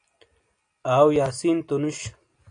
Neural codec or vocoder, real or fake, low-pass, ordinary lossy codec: none; real; 9.9 kHz; AAC, 48 kbps